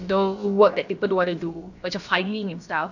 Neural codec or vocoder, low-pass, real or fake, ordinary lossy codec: codec, 16 kHz, about 1 kbps, DyCAST, with the encoder's durations; 7.2 kHz; fake; none